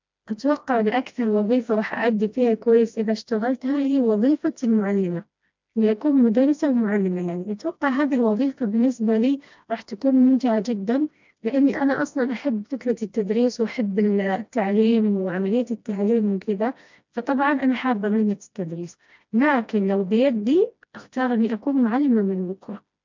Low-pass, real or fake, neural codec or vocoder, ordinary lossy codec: 7.2 kHz; fake; codec, 16 kHz, 1 kbps, FreqCodec, smaller model; MP3, 64 kbps